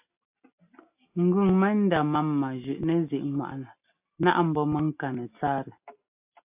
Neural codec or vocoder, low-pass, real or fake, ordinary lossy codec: none; 3.6 kHz; real; AAC, 32 kbps